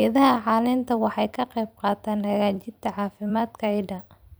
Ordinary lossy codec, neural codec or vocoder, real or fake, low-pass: none; vocoder, 44.1 kHz, 128 mel bands every 256 samples, BigVGAN v2; fake; none